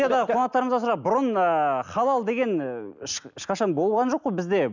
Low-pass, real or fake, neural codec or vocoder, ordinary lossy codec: 7.2 kHz; real; none; none